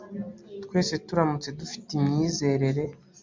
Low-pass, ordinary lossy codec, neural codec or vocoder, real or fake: 7.2 kHz; MP3, 64 kbps; none; real